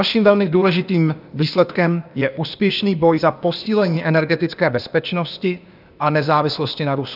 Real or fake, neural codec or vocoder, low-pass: fake; codec, 16 kHz, 0.8 kbps, ZipCodec; 5.4 kHz